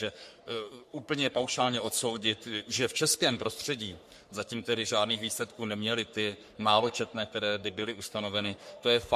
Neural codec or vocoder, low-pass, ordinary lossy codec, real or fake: codec, 44.1 kHz, 3.4 kbps, Pupu-Codec; 14.4 kHz; MP3, 64 kbps; fake